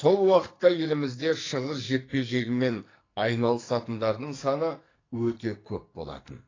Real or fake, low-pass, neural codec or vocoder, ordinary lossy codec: fake; 7.2 kHz; codec, 44.1 kHz, 2.6 kbps, SNAC; AAC, 32 kbps